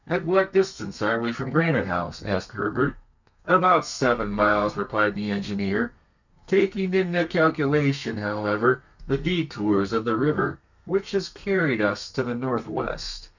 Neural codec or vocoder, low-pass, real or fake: codec, 32 kHz, 1.9 kbps, SNAC; 7.2 kHz; fake